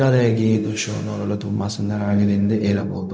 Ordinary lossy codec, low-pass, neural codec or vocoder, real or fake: none; none; codec, 16 kHz, 0.4 kbps, LongCat-Audio-Codec; fake